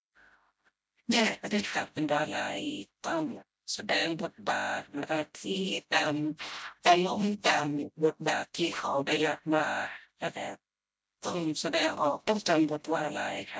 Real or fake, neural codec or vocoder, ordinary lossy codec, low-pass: fake; codec, 16 kHz, 0.5 kbps, FreqCodec, smaller model; none; none